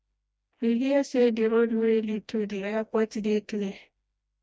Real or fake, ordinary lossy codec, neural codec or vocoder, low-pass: fake; none; codec, 16 kHz, 1 kbps, FreqCodec, smaller model; none